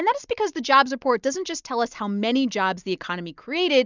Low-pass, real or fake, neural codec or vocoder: 7.2 kHz; real; none